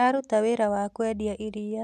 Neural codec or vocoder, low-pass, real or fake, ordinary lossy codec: none; 14.4 kHz; real; none